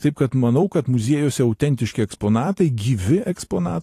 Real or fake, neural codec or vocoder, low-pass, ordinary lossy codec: fake; vocoder, 44.1 kHz, 128 mel bands every 256 samples, BigVGAN v2; 14.4 kHz; AAC, 48 kbps